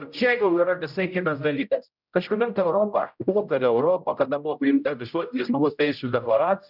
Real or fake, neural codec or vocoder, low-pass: fake; codec, 16 kHz, 0.5 kbps, X-Codec, HuBERT features, trained on general audio; 5.4 kHz